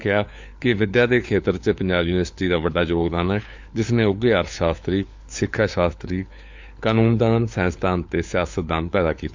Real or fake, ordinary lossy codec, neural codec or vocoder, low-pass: fake; MP3, 64 kbps; codec, 16 kHz, 4 kbps, FunCodec, trained on LibriTTS, 50 frames a second; 7.2 kHz